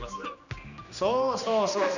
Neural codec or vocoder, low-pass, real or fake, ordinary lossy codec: codec, 16 kHz, 2 kbps, X-Codec, HuBERT features, trained on general audio; 7.2 kHz; fake; none